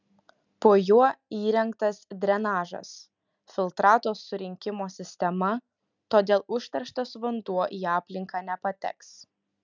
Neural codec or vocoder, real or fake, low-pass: none; real; 7.2 kHz